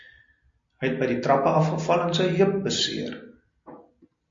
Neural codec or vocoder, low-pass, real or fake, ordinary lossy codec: none; 7.2 kHz; real; MP3, 48 kbps